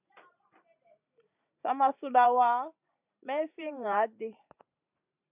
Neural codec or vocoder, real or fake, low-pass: vocoder, 44.1 kHz, 128 mel bands every 512 samples, BigVGAN v2; fake; 3.6 kHz